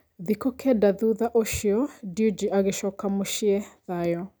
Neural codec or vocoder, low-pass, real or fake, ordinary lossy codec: none; none; real; none